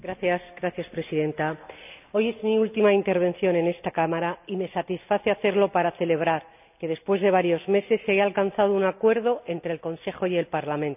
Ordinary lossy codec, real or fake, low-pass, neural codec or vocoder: none; real; 3.6 kHz; none